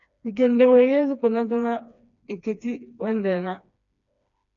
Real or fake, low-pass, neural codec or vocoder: fake; 7.2 kHz; codec, 16 kHz, 2 kbps, FreqCodec, smaller model